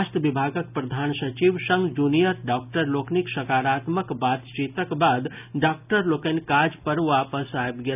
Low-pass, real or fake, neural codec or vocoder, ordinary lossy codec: 3.6 kHz; real; none; none